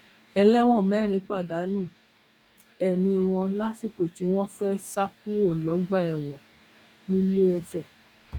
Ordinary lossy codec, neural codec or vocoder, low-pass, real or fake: none; codec, 44.1 kHz, 2.6 kbps, DAC; 19.8 kHz; fake